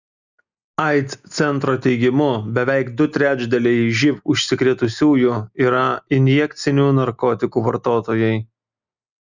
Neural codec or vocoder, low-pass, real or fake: none; 7.2 kHz; real